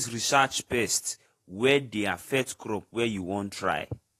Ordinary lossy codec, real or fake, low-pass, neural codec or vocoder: AAC, 48 kbps; fake; 14.4 kHz; vocoder, 48 kHz, 128 mel bands, Vocos